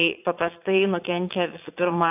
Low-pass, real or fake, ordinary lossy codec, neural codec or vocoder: 3.6 kHz; fake; AAC, 32 kbps; vocoder, 22.05 kHz, 80 mel bands, Vocos